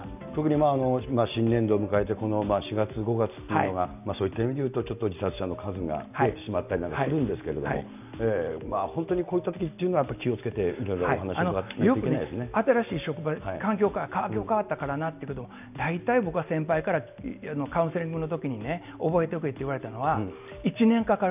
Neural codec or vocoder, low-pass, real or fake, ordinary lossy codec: none; 3.6 kHz; real; none